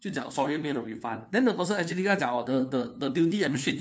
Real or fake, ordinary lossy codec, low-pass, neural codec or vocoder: fake; none; none; codec, 16 kHz, 2 kbps, FunCodec, trained on LibriTTS, 25 frames a second